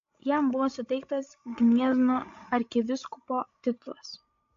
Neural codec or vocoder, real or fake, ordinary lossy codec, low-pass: codec, 16 kHz, 16 kbps, FreqCodec, larger model; fake; AAC, 48 kbps; 7.2 kHz